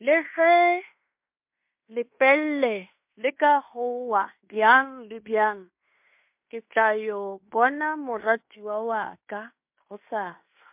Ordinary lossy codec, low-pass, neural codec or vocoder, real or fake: MP3, 32 kbps; 3.6 kHz; codec, 16 kHz in and 24 kHz out, 0.9 kbps, LongCat-Audio-Codec, fine tuned four codebook decoder; fake